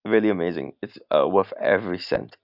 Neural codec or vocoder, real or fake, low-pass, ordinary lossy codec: none; real; 5.4 kHz; none